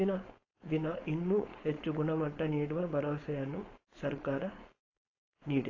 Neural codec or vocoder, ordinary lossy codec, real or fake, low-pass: codec, 16 kHz, 4.8 kbps, FACodec; AAC, 32 kbps; fake; 7.2 kHz